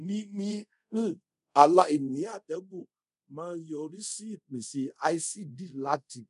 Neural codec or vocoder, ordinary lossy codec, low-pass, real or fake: codec, 24 kHz, 0.5 kbps, DualCodec; none; 10.8 kHz; fake